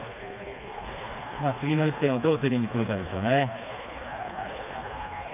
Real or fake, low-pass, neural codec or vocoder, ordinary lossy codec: fake; 3.6 kHz; codec, 16 kHz, 2 kbps, FreqCodec, smaller model; none